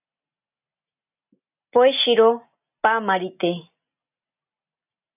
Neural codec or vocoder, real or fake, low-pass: none; real; 3.6 kHz